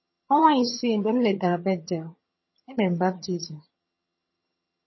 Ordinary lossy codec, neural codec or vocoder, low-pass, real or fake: MP3, 24 kbps; vocoder, 22.05 kHz, 80 mel bands, HiFi-GAN; 7.2 kHz; fake